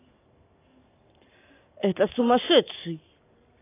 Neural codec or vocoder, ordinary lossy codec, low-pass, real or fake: none; AAC, 24 kbps; 3.6 kHz; real